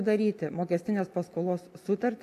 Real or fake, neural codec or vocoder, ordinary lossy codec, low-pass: real; none; AAC, 64 kbps; 14.4 kHz